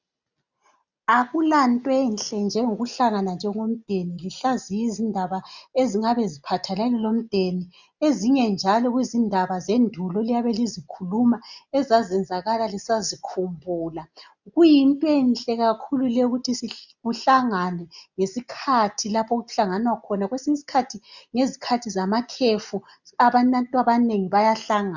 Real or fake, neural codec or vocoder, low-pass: real; none; 7.2 kHz